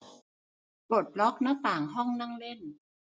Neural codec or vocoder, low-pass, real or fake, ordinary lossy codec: none; none; real; none